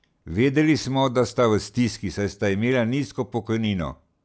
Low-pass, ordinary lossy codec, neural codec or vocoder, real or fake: none; none; none; real